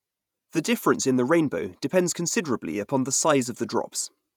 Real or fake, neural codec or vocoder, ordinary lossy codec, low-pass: fake; vocoder, 48 kHz, 128 mel bands, Vocos; none; 19.8 kHz